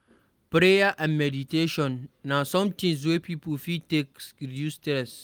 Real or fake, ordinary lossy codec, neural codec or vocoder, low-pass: real; Opus, 32 kbps; none; 19.8 kHz